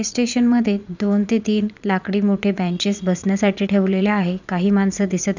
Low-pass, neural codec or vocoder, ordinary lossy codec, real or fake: 7.2 kHz; vocoder, 44.1 kHz, 80 mel bands, Vocos; none; fake